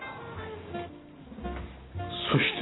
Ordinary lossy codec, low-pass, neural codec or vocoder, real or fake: AAC, 16 kbps; 7.2 kHz; none; real